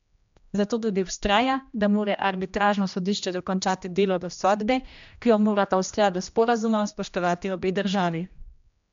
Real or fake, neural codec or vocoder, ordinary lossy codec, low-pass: fake; codec, 16 kHz, 1 kbps, X-Codec, HuBERT features, trained on general audio; MP3, 64 kbps; 7.2 kHz